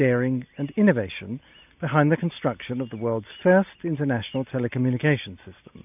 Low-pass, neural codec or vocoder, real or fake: 3.6 kHz; none; real